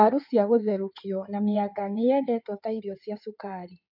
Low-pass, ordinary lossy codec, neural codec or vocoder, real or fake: 5.4 kHz; MP3, 48 kbps; codec, 16 kHz in and 24 kHz out, 2.2 kbps, FireRedTTS-2 codec; fake